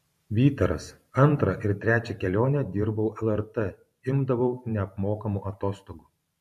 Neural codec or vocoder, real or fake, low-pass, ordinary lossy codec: vocoder, 44.1 kHz, 128 mel bands every 512 samples, BigVGAN v2; fake; 14.4 kHz; MP3, 64 kbps